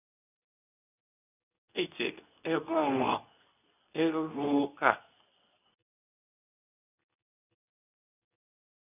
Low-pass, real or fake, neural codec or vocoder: 3.6 kHz; fake; codec, 24 kHz, 0.9 kbps, WavTokenizer, medium speech release version 2